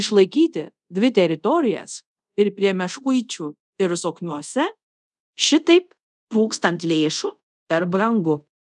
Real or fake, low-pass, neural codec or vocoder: fake; 10.8 kHz; codec, 24 kHz, 0.5 kbps, DualCodec